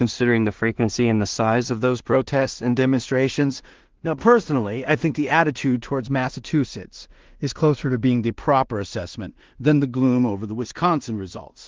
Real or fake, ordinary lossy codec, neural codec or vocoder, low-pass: fake; Opus, 16 kbps; codec, 16 kHz in and 24 kHz out, 0.4 kbps, LongCat-Audio-Codec, two codebook decoder; 7.2 kHz